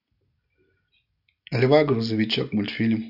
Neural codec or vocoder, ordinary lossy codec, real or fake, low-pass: none; none; real; 5.4 kHz